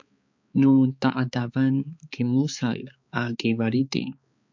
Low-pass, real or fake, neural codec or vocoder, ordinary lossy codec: 7.2 kHz; fake; codec, 16 kHz, 4 kbps, X-Codec, HuBERT features, trained on balanced general audio; MP3, 64 kbps